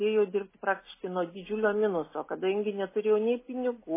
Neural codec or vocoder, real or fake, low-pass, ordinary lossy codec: none; real; 3.6 kHz; MP3, 16 kbps